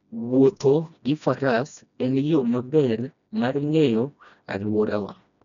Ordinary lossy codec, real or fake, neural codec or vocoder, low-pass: none; fake; codec, 16 kHz, 1 kbps, FreqCodec, smaller model; 7.2 kHz